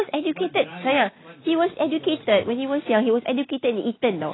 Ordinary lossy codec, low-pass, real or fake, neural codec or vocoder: AAC, 16 kbps; 7.2 kHz; real; none